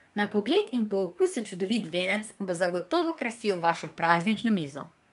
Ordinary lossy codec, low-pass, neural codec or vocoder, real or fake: none; 10.8 kHz; codec, 24 kHz, 1 kbps, SNAC; fake